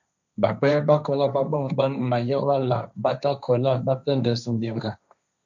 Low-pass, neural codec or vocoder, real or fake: 7.2 kHz; codec, 16 kHz, 1.1 kbps, Voila-Tokenizer; fake